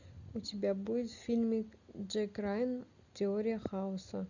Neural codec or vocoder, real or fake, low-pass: none; real; 7.2 kHz